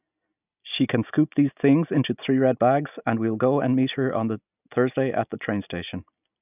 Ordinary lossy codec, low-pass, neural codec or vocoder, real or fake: none; 3.6 kHz; none; real